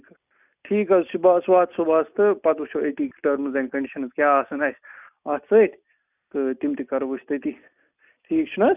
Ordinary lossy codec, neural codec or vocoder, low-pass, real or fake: none; none; 3.6 kHz; real